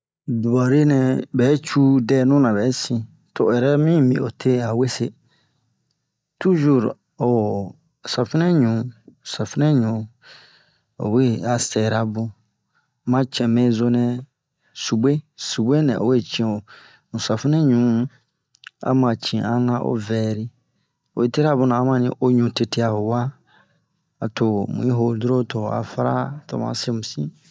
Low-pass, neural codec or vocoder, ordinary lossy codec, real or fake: none; none; none; real